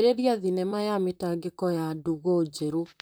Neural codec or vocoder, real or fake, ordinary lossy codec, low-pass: vocoder, 44.1 kHz, 128 mel bands, Pupu-Vocoder; fake; none; none